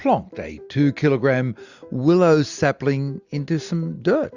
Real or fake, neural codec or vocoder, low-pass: real; none; 7.2 kHz